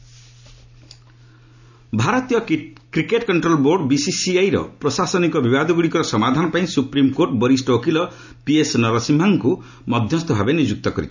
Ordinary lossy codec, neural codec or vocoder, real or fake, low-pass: none; none; real; 7.2 kHz